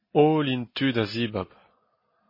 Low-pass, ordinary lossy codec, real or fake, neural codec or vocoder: 5.4 kHz; MP3, 24 kbps; real; none